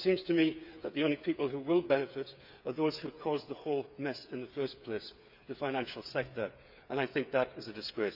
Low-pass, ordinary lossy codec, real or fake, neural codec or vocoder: 5.4 kHz; none; fake; codec, 16 kHz, 8 kbps, FreqCodec, smaller model